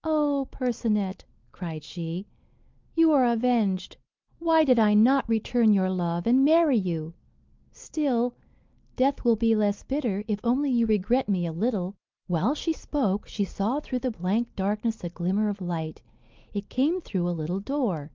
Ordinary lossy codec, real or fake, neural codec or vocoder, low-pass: Opus, 32 kbps; real; none; 7.2 kHz